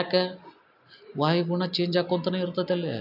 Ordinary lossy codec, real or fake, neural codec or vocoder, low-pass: AAC, 48 kbps; real; none; 5.4 kHz